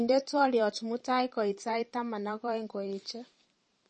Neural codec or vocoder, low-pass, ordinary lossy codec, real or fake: vocoder, 44.1 kHz, 128 mel bands every 512 samples, BigVGAN v2; 10.8 kHz; MP3, 32 kbps; fake